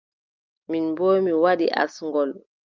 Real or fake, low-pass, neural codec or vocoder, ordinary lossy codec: real; 7.2 kHz; none; Opus, 24 kbps